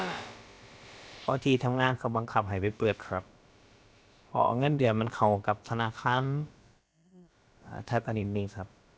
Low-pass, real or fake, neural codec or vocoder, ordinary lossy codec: none; fake; codec, 16 kHz, about 1 kbps, DyCAST, with the encoder's durations; none